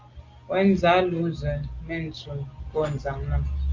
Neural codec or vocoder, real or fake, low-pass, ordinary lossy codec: none; real; 7.2 kHz; Opus, 32 kbps